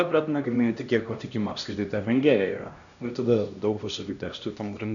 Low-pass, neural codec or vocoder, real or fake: 7.2 kHz; codec, 16 kHz, 1 kbps, X-Codec, HuBERT features, trained on LibriSpeech; fake